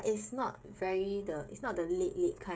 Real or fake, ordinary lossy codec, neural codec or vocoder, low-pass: fake; none; codec, 16 kHz, 16 kbps, FreqCodec, smaller model; none